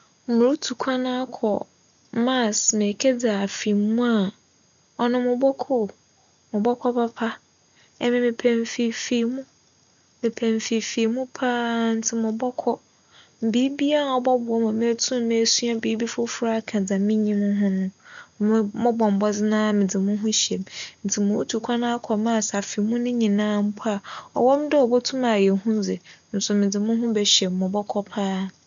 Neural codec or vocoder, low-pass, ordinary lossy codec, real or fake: none; 7.2 kHz; none; real